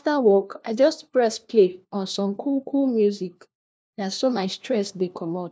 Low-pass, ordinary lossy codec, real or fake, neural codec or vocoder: none; none; fake; codec, 16 kHz, 1 kbps, FunCodec, trained on LibriTTS, 50 frames a second